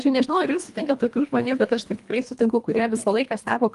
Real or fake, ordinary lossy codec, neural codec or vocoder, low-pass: fake; Opus, 32 kbps; codec, 24 kHz, 1.5 kbps, HILCodec; 10.8 kHz